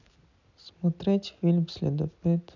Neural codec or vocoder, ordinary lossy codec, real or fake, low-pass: none; none; real; 7.2 kHz